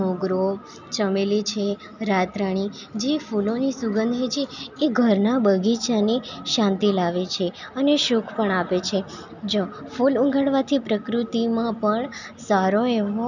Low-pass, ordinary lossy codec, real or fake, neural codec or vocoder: 7.2 kHz; none; real; none